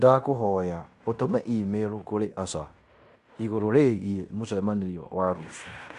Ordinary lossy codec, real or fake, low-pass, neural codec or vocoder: Opus, 64 kbps; fake; 10.8 kHz; codec, 16 kHz in and 24 kHz out, 0.9 kbps, LongCat-Audio-Codec, fine tuned four codebook decoder